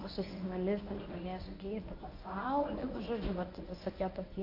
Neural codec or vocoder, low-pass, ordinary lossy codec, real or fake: codec, 24 kHz, 0.9 kbps, WavTokenizer, medium speech release version 1; 5.4 kHz; AAC, 32 kbps; fake